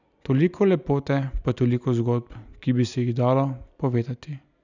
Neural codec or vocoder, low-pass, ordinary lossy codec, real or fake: none; 7.2 kHz; none; real